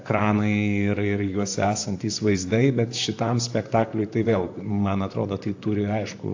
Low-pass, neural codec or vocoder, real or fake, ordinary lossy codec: 7.2 kHz; vocoder, 44.1 kHz, 128 mel bands, Pupu-Vocoder; fake; AAC, 48 kbps